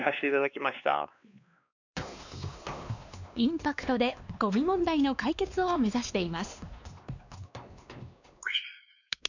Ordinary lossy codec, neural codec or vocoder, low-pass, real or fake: none; codec, 16 kHz, 2 kbps, X-Codec, WavLM features, trained on Multilingual LibriSpeech; 7.2 kHz; fake